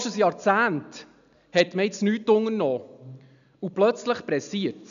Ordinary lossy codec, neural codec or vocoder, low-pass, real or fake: none; none; 7.2 kHz; real